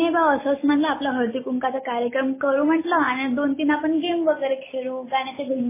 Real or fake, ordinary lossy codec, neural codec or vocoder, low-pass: real; MP3, 16 kbps; none; 3.6 kHz